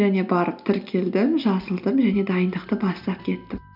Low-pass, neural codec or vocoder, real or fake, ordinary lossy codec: 5.4 kHz; none; real; none